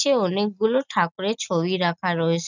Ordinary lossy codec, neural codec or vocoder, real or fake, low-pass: none; none; real; 7.2 kHz